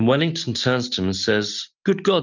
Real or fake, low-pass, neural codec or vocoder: real; 7.2 kHz; none